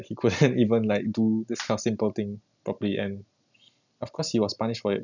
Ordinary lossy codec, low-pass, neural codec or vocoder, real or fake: none; 7.2 kHz; none; real